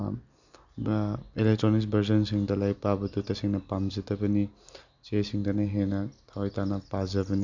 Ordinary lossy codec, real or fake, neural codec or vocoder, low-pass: none; real; none; 7.2 kHz